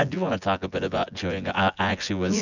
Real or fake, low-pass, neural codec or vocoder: fake; 7.2 kHz; vocoder, 24 kHz, 100 mel bands, Vocos